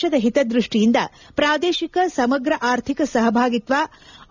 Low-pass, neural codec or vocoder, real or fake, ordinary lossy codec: 7.2 kHz; none; real; none